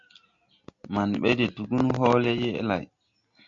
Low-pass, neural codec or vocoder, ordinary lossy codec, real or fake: 7.2 kHz; none; MP3, 48 kbps; real